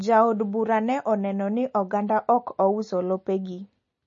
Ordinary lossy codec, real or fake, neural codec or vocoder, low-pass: MP3, 32 kbps; real; none; 7.2 kHz